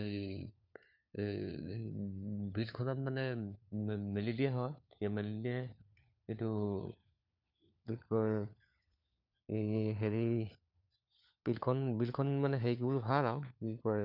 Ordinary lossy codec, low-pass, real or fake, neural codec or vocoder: none; 5.4 kHz; fake; codec, 16 kHz, 4 kbps, FunCodec, trained on LibriTTS, 50 frames a second